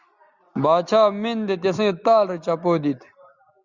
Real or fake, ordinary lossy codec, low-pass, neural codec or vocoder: real; Opus, 64 kbps; 7.2 kHz; none